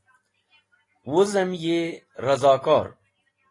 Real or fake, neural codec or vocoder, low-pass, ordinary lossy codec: real; none; 10.8 kHz; AAC, 32 kbps